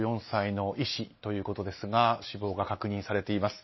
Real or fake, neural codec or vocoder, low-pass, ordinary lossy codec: real; none; 7.2 kHz; MP3, 24 kbps